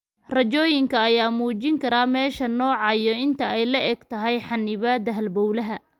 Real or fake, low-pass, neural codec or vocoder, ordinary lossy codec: real; 19.8 kHz; none; Opus, 32 kbps